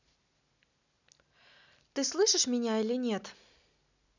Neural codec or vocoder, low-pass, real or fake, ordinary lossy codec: none; 7.2 kHz; real; none